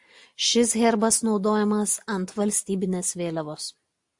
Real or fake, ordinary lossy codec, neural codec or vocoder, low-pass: real; AAC, 64 kbps; none; 10.8 kHz